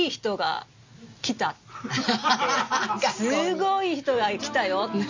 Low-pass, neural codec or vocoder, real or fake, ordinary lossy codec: 7.2 kHz; none; real; MP3, 64 kbps